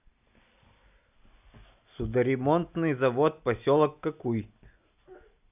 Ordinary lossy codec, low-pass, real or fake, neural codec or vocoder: none; 3.6 kHz; real; none